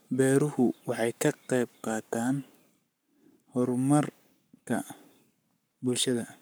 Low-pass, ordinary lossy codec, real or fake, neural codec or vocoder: none; none; fake; codec, 44.1 kHz, 7.8 kbps, Pupu-Codec